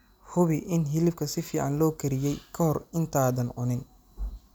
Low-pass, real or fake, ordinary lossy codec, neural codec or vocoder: none; real; none; none